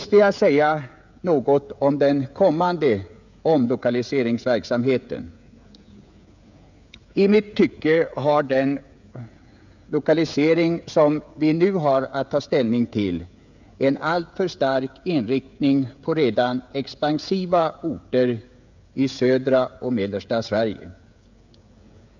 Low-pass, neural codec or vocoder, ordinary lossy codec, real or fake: 7.2 kHz; codec, 16 kHz, 16 kbps, FreqCodec, smaller model; none; fake